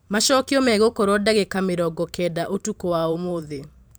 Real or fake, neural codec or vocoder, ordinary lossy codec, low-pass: fake; vocoder, 44.1 kHz, 128 mel bands every 512 samples, BigVGAN v2; none; none